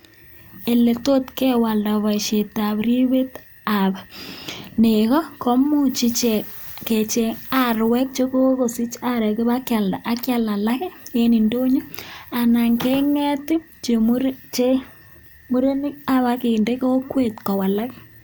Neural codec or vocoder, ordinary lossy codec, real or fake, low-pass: none; none; real; none